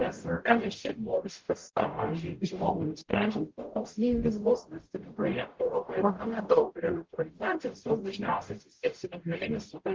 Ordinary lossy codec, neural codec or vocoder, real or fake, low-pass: Opus, 16 kbps; codec, 44.1 kHz, 0.9 kbps, DAC; fake; 7.2 kHz